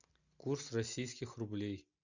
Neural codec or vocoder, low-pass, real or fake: none; 7.2 kHz; real